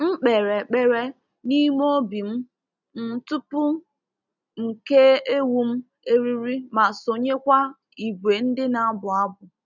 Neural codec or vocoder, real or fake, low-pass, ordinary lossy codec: none; real; 7.2 kHz; none